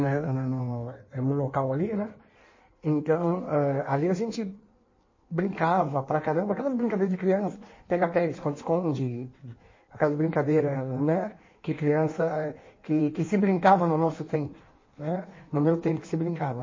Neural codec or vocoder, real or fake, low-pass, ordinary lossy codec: codec, 16 kHz in and 24 kHz out, 1.1 kbps, FireRedTTS-2 codec; fake; 7.2 kHz; MP3, 32 kbps